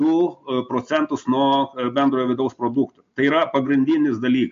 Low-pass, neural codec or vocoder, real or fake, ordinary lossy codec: 7.2 kHz; none; real; MP3, 48 kbps